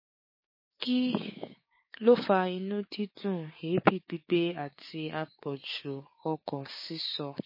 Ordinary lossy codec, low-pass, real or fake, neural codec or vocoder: MP3, 24 kbps; 5.4 kHz; fake; codec, 16 kHz, 6 kbps, DAC